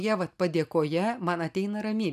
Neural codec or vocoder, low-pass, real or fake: none; 14.4 kHz; real